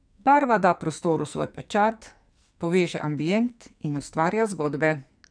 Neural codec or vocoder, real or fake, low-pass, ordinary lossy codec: codec, 44.1 kHz, 2.6 kbps, SNAC; fake; 9.9 kHz; none